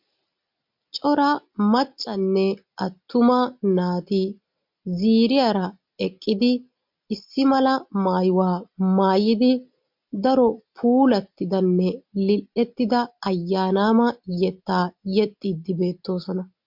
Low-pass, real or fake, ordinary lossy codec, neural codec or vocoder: 5.4 kHz; real; MP3, 48 kbps; none